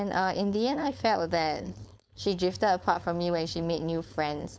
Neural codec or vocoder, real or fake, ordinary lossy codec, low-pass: codec, 16 kHz, 4.8 kbps, FACodec; fake; none; none